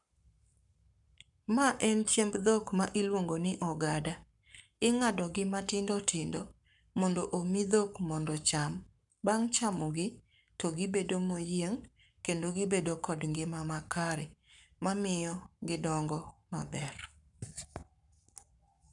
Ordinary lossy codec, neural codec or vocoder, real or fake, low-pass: none; codec, 44.1 kHz, 7.8 kbps, Pupu-Codec; fake; 10.8 kHz